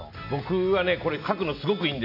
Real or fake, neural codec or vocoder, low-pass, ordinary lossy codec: real; none; 5.4 kHz; none